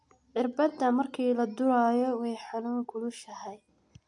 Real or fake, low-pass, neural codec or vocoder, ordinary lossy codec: real; 10.8 kHz; none; MP3, 64 kbps